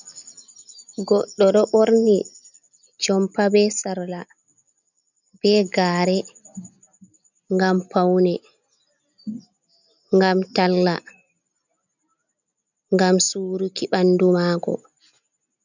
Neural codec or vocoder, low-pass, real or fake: none; 7.2 kHz; real